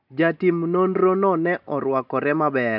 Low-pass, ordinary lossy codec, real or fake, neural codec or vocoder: 5.4 kHz; none; real; none